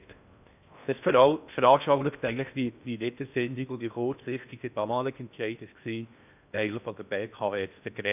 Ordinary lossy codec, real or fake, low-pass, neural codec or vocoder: none; fake; 3.6 kHz; codec, 16 kHz in and 24 kHz out, 0.6 kbps, FocalCodec, streaming, 4096 codes